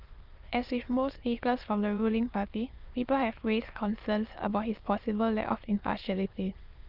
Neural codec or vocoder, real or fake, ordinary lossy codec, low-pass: autoencoder, 22.05 kHz, a latent of 192 numbers a frame, VITS, trained on many speakers; fake; Opus, 32 kbps; 5.4 kHz